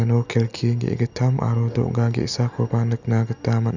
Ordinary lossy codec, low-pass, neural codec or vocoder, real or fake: none; 7.2 kHz; none; real